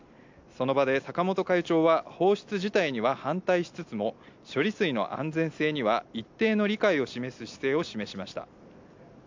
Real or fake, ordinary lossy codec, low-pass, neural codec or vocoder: real; MP3, 64 kbps; 7.2 kHz; none